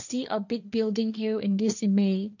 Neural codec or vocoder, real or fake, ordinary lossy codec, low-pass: codec, 16 kHz, 1.1 kbps, Voila-Tokenizer; fake; none; 7.2 kHz